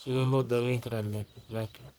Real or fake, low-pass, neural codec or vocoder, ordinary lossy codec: fake; none; codec, 44.1 kHz, 1.7 kbps, Pupu-Codec; none